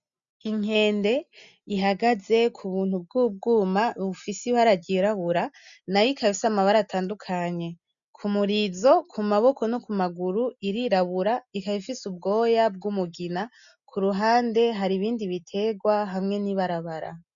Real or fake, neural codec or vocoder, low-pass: real; none; 7.2 kHz